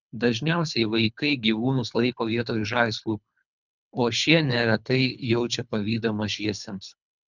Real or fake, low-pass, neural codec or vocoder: fake; 7.2 kHz; codec, 24 kHz, 3 kbps, HILCodec